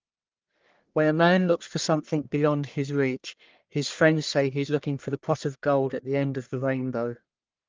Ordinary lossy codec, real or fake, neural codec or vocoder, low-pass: Opus, 32 kbps; fake; codec, 44.1 kHz, 1.7 kbps, Pupu-Codec; 7.2 kHz